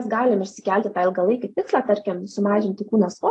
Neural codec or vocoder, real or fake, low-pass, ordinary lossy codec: none; real; 10.8 kHz; AAC, 48 kbps